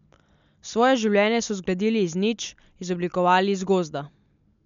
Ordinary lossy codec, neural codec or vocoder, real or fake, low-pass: MP3, 64 kbps; none; real; 7.2 kHz